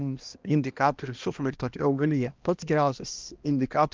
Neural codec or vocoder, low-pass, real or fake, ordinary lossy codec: codec, 16 kHz, 1 kbps, X-Codec, HuBERT features, trained on balanced general audio; 7.2 kHz; fake; Opus, 24 kbps